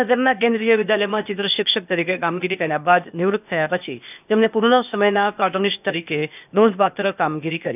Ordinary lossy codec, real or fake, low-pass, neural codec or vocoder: none; fake; 3.6 kHz; codec, 16 kHz, 0.8 kbps, ZipCodec